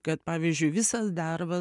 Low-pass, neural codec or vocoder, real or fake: 10.8 kHz; none; real